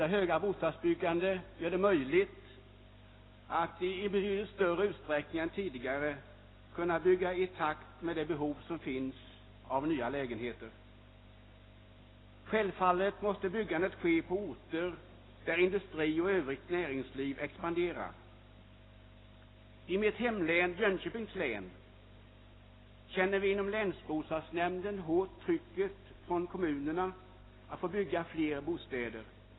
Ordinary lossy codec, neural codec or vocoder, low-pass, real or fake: AAC, 16 kbps; none; 7.2 kHz; real